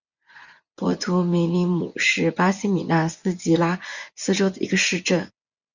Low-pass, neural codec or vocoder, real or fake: 7.2 kHz; vocoder, 24 kHz, 100 mel bands, Vocos; fake